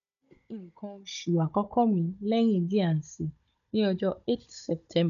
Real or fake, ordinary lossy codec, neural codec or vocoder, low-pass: fake; none; codec, 16 kHz, 16 kbps, FunCodec, trained on Chinese and English, 50 frames a second; 7.2 kHz